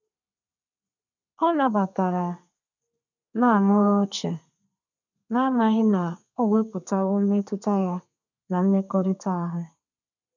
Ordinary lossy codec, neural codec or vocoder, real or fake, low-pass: none; codec, 32 kHz, 1.9 kbps, SNAC; fake; 7.2 kHz